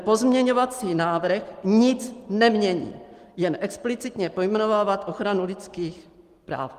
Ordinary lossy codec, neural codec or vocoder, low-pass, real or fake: Opus, 24 kbps; none; 14.4 kHz; real